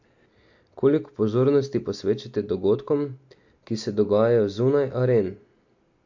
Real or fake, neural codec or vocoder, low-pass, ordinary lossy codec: real; none; 7.2 kHz; MP3, 48 kbps